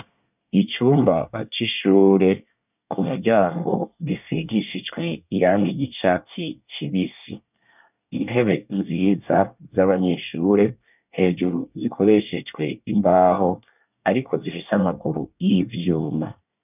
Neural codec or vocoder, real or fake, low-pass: codec, 24 kHz, 1 kbps, SNAC; fake; 3.6 kHz